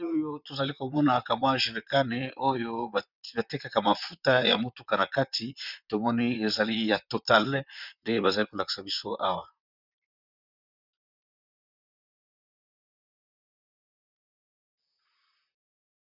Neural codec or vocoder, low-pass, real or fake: vocoder, 44.1 kHz, 128 mel bands, Pupu-Vocoder; 5.4 kHz; fake